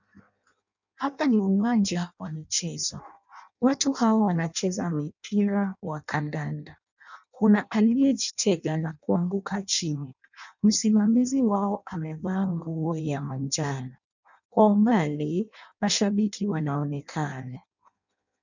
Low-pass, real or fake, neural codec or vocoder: 7.2 kHz; fake; codec, 16 kHz in and 24 kHz out, 0.6 kbps, FireRedTTS-2 codec